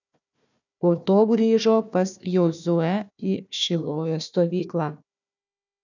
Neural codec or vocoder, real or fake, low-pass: codec, 16 kHz, 1 kbps, FunCodec, trained on Chinese and English, 50 frames a second; fake; 7.2 kHz